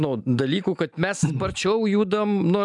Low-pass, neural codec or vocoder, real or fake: 10.8 kHz; none; real